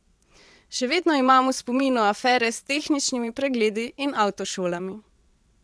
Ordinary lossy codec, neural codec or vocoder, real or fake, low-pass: none; vocoder, 22.05 kHz, 80 mel bands, WaveNeXt; fake; none